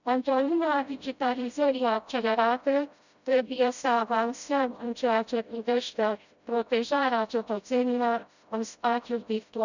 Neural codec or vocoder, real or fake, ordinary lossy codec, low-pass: codec, 16 kHz, 0.5 kbps, FreqCodec, smaller model; fake; none; 7.2 kHz